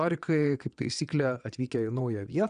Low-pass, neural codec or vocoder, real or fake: 9.9 kHz; vocoder, 22.05 kHz, 80 mel bands, WaveNeXt; fake